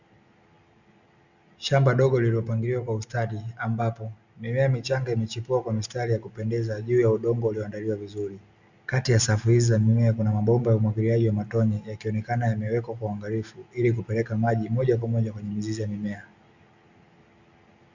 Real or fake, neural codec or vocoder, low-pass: real; none; 7.2 kHz